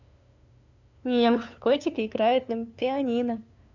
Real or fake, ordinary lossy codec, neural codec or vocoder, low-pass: fake; none; codec, 16 kHz, 2 kbps, FunCodec, trained on LibriTTS, 25 frames a second; 7.2 kHz